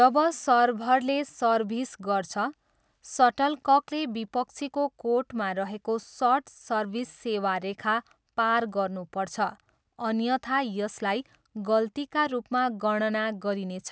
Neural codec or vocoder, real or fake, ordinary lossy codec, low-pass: none; real; none; none